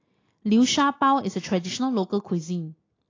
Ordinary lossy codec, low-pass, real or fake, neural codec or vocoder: AAC, 32 kbps; 7.2 kHz; real; none